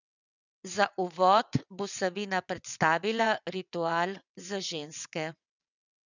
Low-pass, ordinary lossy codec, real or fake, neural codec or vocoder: 7.2 kHz; none; fake; vocoder, 22.05 kHz, 80 mel bands, WaveNeXt